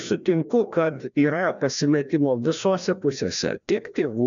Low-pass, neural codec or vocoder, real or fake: 7.2 kHz; codec, 16 kHz, 1 kbps, FreqCodec, larger model; fake